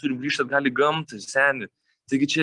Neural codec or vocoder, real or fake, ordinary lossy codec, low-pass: none; real; Opus, 32 kbps; 10.8 kHz